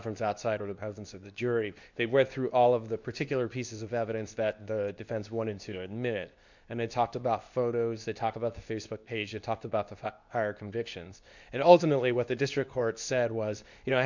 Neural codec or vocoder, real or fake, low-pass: codec, 24 kHz, 0.9 kbps, WavTokenizer, medium speech release version 2; fake; 7.2 kHz